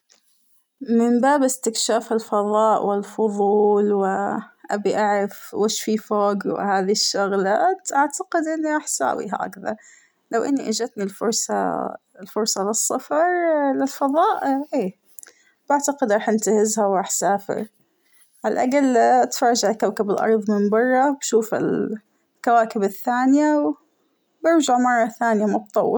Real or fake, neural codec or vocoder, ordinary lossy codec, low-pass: real; none; none; none